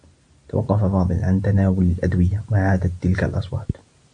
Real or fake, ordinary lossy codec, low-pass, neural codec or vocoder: real; AAC, 64 kbps; 9.9 kHz; none